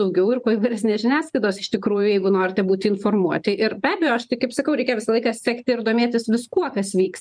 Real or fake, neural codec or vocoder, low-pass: fake; vocoder, 44.1 kHz, 128 mel bands every 512 samples, BigVGAN v2; 9.9 kHz